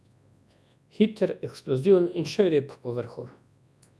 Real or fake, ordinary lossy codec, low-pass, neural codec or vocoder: fake; none; none; codec, 24 kHz, 0.9 kbps, WavTokenizer, large speech release